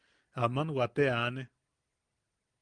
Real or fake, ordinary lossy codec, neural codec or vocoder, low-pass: real; Opus, 32 kbps; none; 9.9 kHz